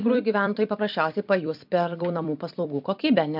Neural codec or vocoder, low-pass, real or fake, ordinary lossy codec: vocoder, 44.1 kHz, 128 mel bands every 256 samples, BigVGAN v2; 5.4 kHz; fake; MP3, 48 kbps